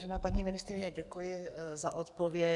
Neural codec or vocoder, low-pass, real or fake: codec, 44.1 kHz, 2.6 kbps, SNAC; 10.8 kHz; fake